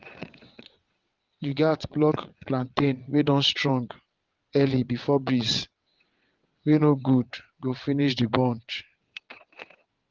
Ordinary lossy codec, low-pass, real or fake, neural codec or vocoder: Opus, 32 kbps; 7.2 kHz; fake; vocoder, 22.05 kHz, 80 mel bands, WaveNeXt